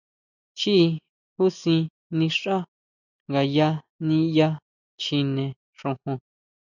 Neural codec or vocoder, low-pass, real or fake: none; 7.2 kHz; real